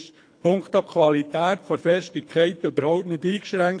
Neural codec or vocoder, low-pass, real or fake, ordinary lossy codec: codec, 24 kHz, 3 kbps, HILCodec; 9.9 kHz; fake; AAC, 48 kbps